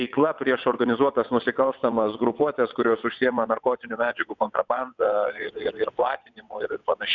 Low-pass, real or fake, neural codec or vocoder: 7.2 kHz; fake; vocoder, 22.05 kHz, 80 mel bands, WaveNeXt